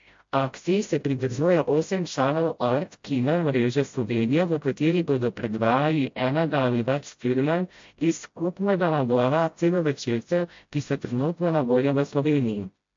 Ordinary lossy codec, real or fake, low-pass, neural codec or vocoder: MP3, 48 kbps; fake; 7.2 kHz; codec, 16 kHz, 0.5 kbps, FreqCodec, smaller model